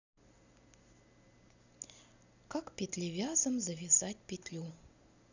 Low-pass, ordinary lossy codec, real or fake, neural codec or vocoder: 7.2 kHz; none; real; none